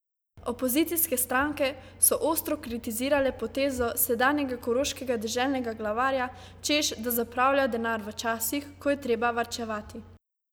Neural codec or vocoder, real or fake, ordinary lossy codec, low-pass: none; real; none; none